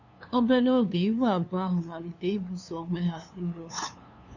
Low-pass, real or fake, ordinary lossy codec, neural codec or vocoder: 7.2 kHz; fake; none; codec, 16 kHz, 2 kbps, FunCodec, trained on LibriTTS, 25 frames a second